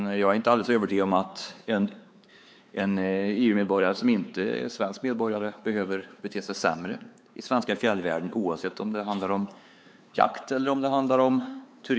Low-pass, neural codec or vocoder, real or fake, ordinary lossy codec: none; codec, 16 kHz, 4 kbps, X-Codec, WavLM features, trained on Multilingual LibriSpeech; fake; none